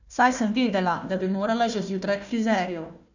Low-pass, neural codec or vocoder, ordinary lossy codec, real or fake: 7.2 kHz; codec, 16 kHz, 1 kbps, FunCodec, trained on Chinese and English, 50 frames a second; none; fake